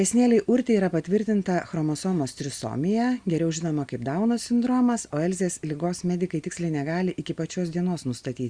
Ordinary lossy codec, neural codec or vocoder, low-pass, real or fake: Opus, 64 kbps; none; 9.9 kHz; real